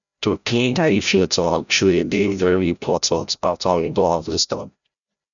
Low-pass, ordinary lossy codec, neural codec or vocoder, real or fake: 7.2 kHz; none; codec, 16 kHz, 0.5 kbps, FreqCodec, larger model; fake